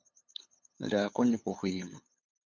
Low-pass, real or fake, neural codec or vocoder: 7.2 kHz; fake; codec, 16 kHz, 8 kbps, FunCodec, trained on LibriTTS, 25 frames a second